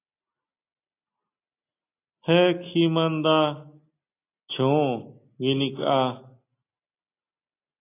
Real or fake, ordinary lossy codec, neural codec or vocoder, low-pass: real; AAC, 24 kbps; none; 3.6 kHz